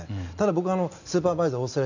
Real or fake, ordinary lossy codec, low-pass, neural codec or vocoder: real; none; 7.2 kHz; none